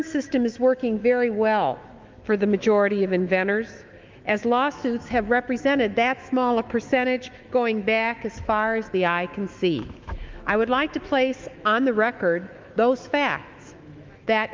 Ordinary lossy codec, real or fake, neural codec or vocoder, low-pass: Opus, 32 kbps; fake; autoencoder, 48 kHz, 128 numbers a frame, DAC-VAE, trained on Japanese speech; 7.2 kHz